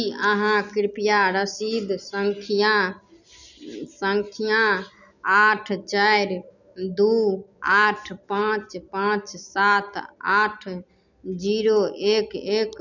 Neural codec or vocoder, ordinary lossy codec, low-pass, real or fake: none; none; 7.2 kHz; real